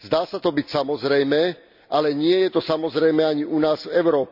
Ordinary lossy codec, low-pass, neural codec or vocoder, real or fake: none; 5.4 kHz; none; real